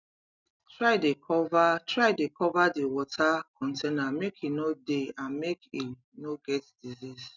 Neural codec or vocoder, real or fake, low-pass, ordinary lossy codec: none; real; 7.2 kHz; none